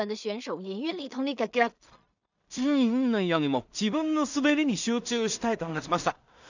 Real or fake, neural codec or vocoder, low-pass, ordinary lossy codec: fake; codec, 16 kHz in and 24 kHz out, 0.4 kbps, LongCat-Audio-Codec, two codebook decoder; 7.2 kHz; none